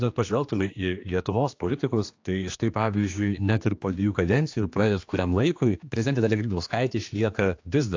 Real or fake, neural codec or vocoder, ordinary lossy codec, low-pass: fake; codec, 16 kHz, 2 kbps, X-Codec, HuBERT features, trained on general audio; AAC, 48 kbps; 7.2 kHz